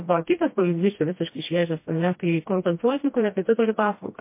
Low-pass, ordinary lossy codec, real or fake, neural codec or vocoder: 3.6 kHz; MP3, 24 kbps; fake; codec, 16 kHz, 1 kbps, FreqCodec, smaller model